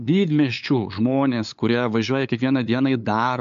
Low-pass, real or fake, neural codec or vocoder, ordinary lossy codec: 7.2 kHz; fake; codec, 16 kHz, 8 kbps, FunCodec, trained on LibriTTS, 25 frames a second; MP3, 64 kbps